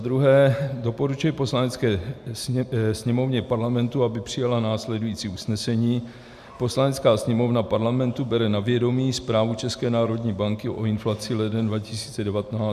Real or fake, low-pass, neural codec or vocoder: real; 14.4 kHz; none